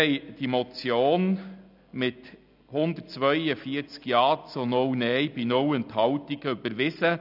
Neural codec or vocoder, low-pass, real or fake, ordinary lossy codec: none; 5.4 kHz; real; none